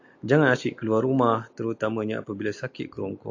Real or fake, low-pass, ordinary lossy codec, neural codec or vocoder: real; 7.2 kHz; AAC, 48 kbps; none